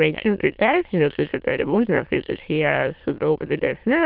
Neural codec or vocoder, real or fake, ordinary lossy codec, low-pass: autoencoder, 22.05 kHz, a latent of 192 numbers a frame, VITS, trained on many speakers; fake; Opus, 24 kbps; 5.4 kHz